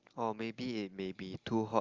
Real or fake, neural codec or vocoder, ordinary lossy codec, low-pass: real; none; Opus, 32 kbps; 7.2 kHz